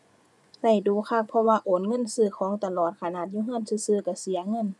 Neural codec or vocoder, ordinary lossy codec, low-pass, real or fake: vocoder, 24 kHz, 100 mel bands, Vocos; none; none; fake